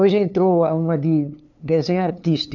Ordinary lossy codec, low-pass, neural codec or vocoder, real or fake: none; 7.2 kHz; codec, 16 kHz, 2 kbps, FunCodec, trained on LibriTTS, 25 frames a second; fake